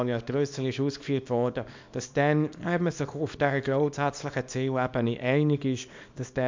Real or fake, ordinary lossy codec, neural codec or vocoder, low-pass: fake; MP3, 64 kbps; codec, 24 kHz, 0.9 kbps, WavTokenizer, small release; 7.2 kHz